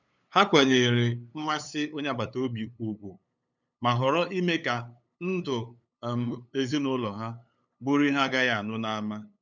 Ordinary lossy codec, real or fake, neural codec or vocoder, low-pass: none; fake; codec, 16 kHz, 8 kbps, FunCodec, trained on LibriTTS, 25 frames a second; 7.2 kHz